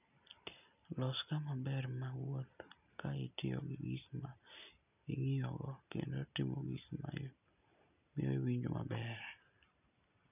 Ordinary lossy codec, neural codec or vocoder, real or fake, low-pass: none; none; real; 3.6 kHz